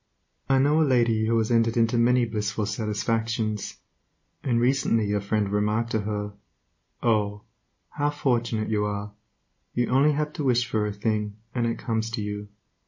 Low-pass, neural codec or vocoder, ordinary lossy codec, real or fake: 7.2 kHz; none; MP3, 32 kbps; real